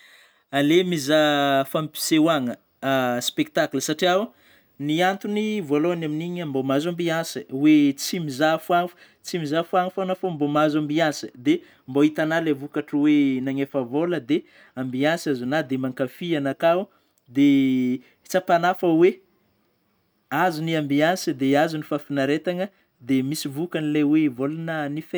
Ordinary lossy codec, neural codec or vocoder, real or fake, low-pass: none; none; real; none